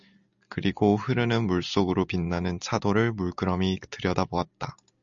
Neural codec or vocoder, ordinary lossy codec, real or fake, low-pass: none; MP3, 96 kbps; real; 7.2 kHz